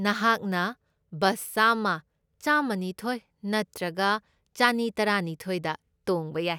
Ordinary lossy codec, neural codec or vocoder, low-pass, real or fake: none; none; none; real